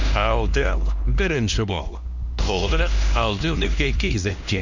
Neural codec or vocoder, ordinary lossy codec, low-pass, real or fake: codec, 16 kHz, 1 kbps, X-Codec, HuBERT features, trained on LibriSpeech; none; 7.2 kHz; fake